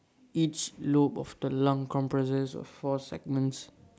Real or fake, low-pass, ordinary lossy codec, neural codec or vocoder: real; none; none; none